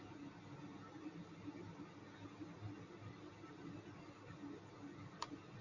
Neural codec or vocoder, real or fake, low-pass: none; real; 7.2 kHz